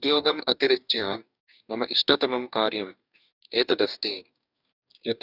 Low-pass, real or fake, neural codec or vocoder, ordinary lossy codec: 5.4 kHz; fake; codec, 44.1 kHz, 2.6 kbps, DAC; none